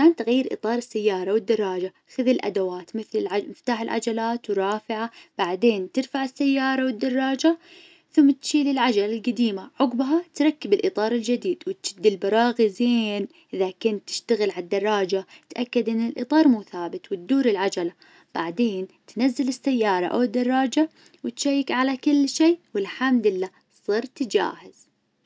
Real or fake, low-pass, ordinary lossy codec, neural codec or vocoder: real; none; none; none